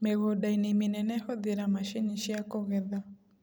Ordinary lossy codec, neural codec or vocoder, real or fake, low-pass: none; none; real; none